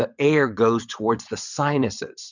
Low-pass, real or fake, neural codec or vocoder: 7.2 kHz; fake; vocoder, 22.05 kHz, 80 mel bands, WaveNeXt